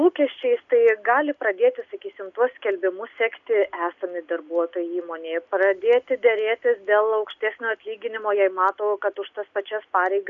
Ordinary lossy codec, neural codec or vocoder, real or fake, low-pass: MP3, 64 kbps; none; real; 7.2 kHz